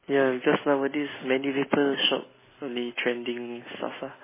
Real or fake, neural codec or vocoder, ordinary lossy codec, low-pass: real; none; MP3, 16 kbps; 3.6 kHz